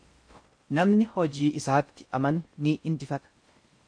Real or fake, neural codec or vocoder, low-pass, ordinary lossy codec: fake; codec, 16 kHz in and 24 kHz out, 0.6 kbps, FocalCodec, streaming, 4096 codes; 9.9 kHz; MP3, 48 kbps